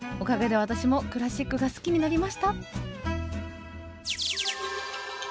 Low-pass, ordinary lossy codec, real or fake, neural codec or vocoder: none; none; real; none